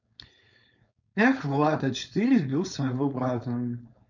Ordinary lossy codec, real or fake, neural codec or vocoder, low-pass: none; fake; codec, 16 kHz, 4.8 kbps, FACodec; 7.2 kHz